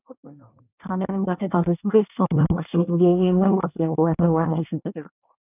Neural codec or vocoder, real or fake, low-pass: codec, 24 kHz, 1 kbps, SNAC; fake; 3.6 kHz